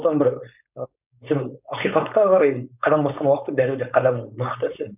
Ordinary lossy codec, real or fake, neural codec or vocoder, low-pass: none; fake; codec, 16 kHz, 4.8 kbps, FACodec; 3.6 kHz